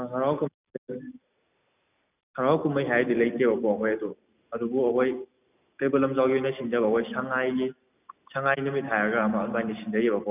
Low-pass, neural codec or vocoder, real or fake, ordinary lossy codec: 3.6 kHz; none; real; none